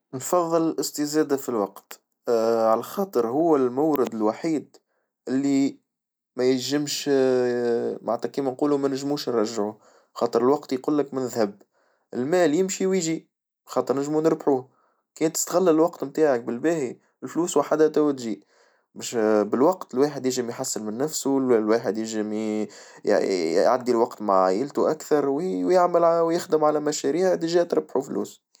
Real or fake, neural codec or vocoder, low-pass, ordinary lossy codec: real; none; none; none